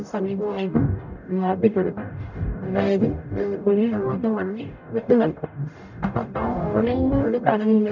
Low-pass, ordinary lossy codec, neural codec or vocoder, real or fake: 7.2 kHz; none; codec, 44.1 kHz, 0.9 kbps, DAC; fake